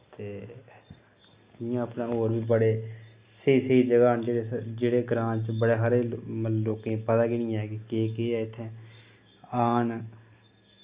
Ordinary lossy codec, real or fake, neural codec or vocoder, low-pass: Opus, 64 kbps; real; none; 3.6 kHz